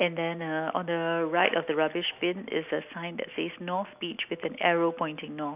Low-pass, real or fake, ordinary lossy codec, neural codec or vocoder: 3.6 kHz; real; none; none